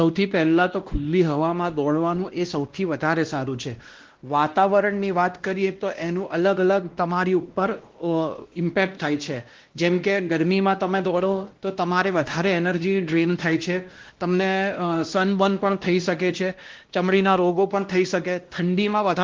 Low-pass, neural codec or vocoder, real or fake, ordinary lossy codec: 7.2 kHz; codec, 16 kHz, 1 kbps, X-Codec, WavLM features, trained on Multilingual LibriSpeech; fake; Opus, 16 kbps